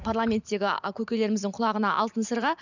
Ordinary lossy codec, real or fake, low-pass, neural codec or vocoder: none; fake; 7.2 kHz; vocoder, 44.1 kHz, 128 mel bands every 512 samples, BigVGAN v2